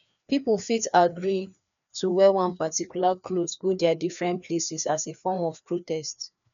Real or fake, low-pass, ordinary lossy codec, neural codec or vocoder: fake; 7.2 kHz; none; codec, 16 kHz, 2 kbps, FreqCodec, larger model